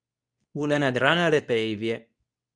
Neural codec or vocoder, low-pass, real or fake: codec, 24 kHz, 0.9 kbps, WavTokenizer, medium speech release version 2; 9.9 kHz; fake